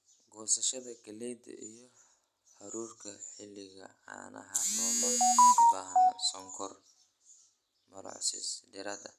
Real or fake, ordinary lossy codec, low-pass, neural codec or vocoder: real; none; 14.4 kHz; none